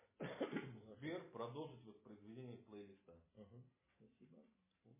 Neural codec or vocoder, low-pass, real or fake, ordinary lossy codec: none; 3.6 kHz; real; MP3, 16 kbps